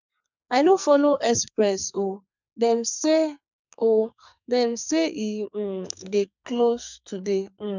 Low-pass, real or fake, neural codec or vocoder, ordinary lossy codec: 7.2 kHz; fake; codec, 44.1 kHz, 2.6 kbps, SNAC; none